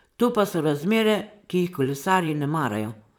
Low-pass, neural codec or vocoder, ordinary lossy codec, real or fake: none; vocoder, 44.1 kHz, 128 mel bands, Pupu-Vocoder; none; fake